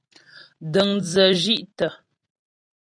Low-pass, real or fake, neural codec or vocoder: 9.9 kHz; fake; vocoder, 44.1 kHz, 128 mel bands every 256 samples, BigVGAN v2